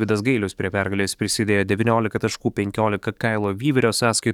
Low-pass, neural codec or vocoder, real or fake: 19.8 kHz; codec, 44.1 kHz, 7.8 kbps, DAC; fake